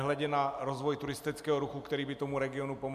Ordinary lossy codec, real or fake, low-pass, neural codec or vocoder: MP3, 96 kbps; real; 14.4 kHz; none